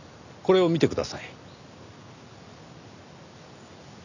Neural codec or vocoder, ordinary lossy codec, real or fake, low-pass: none; none; real; 7.2 kHz